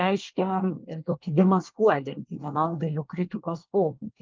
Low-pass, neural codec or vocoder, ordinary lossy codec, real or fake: 7.2 kHz; codec, 24 kHz, 1 kbps, SNAC; Opus, 32 kbps; fake